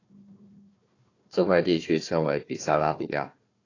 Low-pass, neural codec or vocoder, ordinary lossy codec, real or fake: 7.2 kHz; codec, 16 kHz, 1 kbps, FunCodec, trained on Chinese and English, 50 frames a second; AAC, 32 kbps; fake